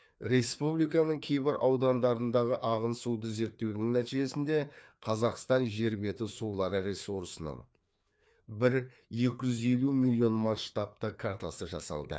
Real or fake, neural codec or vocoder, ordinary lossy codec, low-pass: fake; codec, 16 kHz, 2 kbps, FreqCodec, larger model; none; none